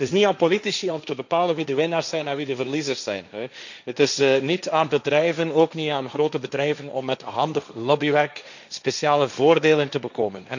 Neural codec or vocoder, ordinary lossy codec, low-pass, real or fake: codec, 16 kHz, 1.1 kbps, Voila-Tokenizer; none; 7.2 kHz; fake